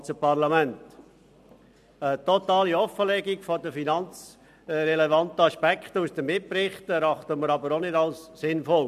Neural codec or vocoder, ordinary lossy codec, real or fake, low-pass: none; AAC, 96 kbps; real; 14.4 kHz